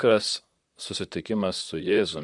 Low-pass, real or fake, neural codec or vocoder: 10.8 kHz; fake; vocoder, 44.1 kHz, 128 mel bands, Pupu-Vocoder